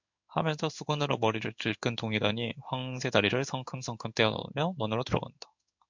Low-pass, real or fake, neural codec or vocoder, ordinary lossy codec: 7.2 kHz; fake; codec, 16 kHz in and 24 kHz out, 1 kbps, XY-Tokenizer; MP3, 64 kbps